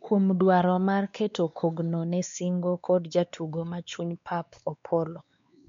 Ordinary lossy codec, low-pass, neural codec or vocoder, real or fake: MP3, 64 kbps; 7.2 kHz; codec, 16 kHz, 2 kbps, X-Codec, WavLM features, trained on Multilingual LibriSpeech; fake